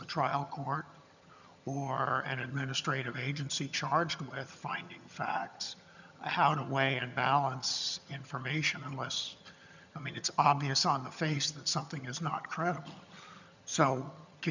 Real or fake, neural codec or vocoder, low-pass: fake; vocoder, 22.05 kHz, 80 mel bands, HiFi-GAN; 7.2 kHz